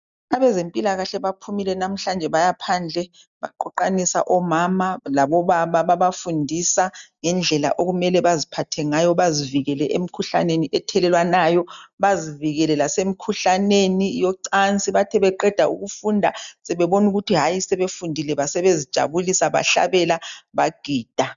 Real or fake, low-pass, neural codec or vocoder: real; 7.2 kHz; none